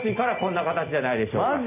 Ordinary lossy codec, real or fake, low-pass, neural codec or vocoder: none; real; 3.6 kHz; none